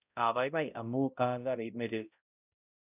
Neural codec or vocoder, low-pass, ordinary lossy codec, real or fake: codec, 16 kHz, 0.5 kbps, X-Codec, HuBERT features, trained on balanced general audio; 3.6 kHz; none; fake